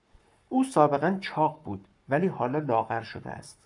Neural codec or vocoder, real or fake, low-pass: codec, 44.1 kHz, 7.8 kbps, Pupu-Codec; fake; 10.8 kHz